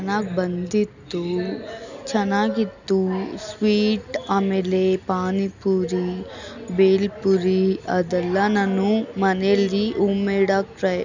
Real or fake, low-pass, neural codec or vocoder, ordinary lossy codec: real; 7.2 kHz; none; none